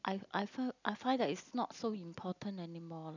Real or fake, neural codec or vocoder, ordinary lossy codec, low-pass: real; none; none; 7.2 kHz